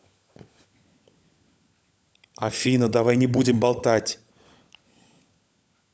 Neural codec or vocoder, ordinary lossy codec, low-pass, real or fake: codec, 16 kHz, 16 kbps, FunCodec, trained on LibriTTS, 50 frames a second; none; none; fake